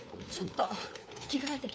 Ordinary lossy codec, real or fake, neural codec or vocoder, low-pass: none; fake; codec, 16 kHz, 4 kbps, FunCodec, trained on LibriTTS, 50 frames a second; none